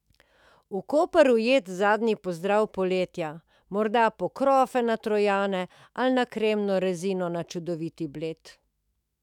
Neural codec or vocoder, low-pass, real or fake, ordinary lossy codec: autoencoder, 48 kHz, 128 numbers a frame, DAC-VAE, trained on Japanese speech; 19.8 kHz; fake; none